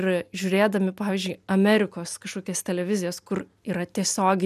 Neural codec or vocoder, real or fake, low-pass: none; real; 14.4 kHz